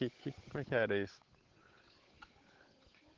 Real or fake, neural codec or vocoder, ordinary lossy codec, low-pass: fake; codec, 16 kHz, 8 kbps, FunCodec, trained on Chinese and English, 25 frames a second; Opus, 16 kbps; 7.2 kHz